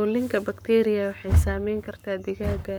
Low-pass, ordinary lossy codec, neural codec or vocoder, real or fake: none; none; codec, 44.1 kHz, 7.8 kbps, Pupu-Codec; fake